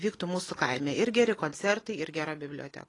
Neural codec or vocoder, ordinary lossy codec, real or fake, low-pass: none; AAC, 32 kbps; real; 10.8 kHz